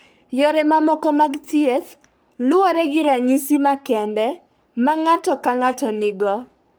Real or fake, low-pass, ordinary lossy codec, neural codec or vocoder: fake; none; none; codec, 44.1 kHz, 3.4 kbps, Pupu-Codec